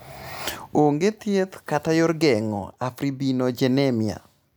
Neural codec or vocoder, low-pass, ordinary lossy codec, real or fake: none; none; none; real